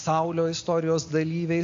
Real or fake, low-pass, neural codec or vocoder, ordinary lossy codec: real; 7.2 kHz; none; AAC, 48 kbps